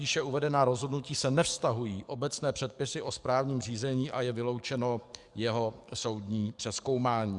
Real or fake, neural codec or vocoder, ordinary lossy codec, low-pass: fake; codec, 44.1 kHz, 7.8 kbps, DAC; Opus, 64 kbps; 10.8 kHz